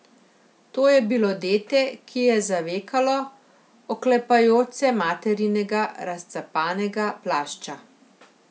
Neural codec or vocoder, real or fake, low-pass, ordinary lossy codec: none; real; none; none